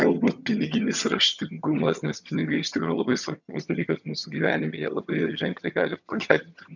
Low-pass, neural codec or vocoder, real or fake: 7.2 kHz; vocoder, 22.05 kHz, 80 mel bands, HiFi-GAN; fake